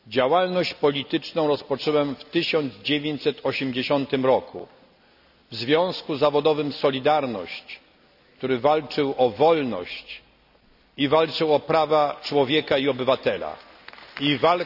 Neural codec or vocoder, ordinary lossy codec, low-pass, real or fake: none; none; 5.4 kHz; real